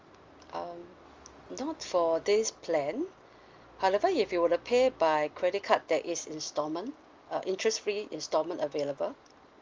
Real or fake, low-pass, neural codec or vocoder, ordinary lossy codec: real; 7.2 kHz; none; Opus, 32 kbps